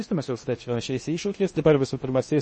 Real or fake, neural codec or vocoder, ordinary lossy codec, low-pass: fake; codec, 16 kHz in and 24 kHz out, 0.6 kbps, FocalCodec, streaming, 2048 codes; MP3, 32 kbps; 10.8 kHz